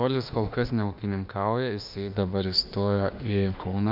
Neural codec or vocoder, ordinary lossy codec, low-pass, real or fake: autoencoder, 48 kHz, 32 numbers a frame, DAC-VAE, trained on Japanese speech; MP3, 48 kbps; 5.4 kHz; fake